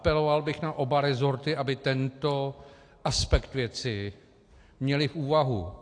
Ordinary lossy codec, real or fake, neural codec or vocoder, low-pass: AAC, 48 kbps; real; none; 9.9 kHz